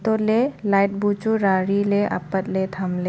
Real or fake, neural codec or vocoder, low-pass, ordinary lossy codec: real; none; none; none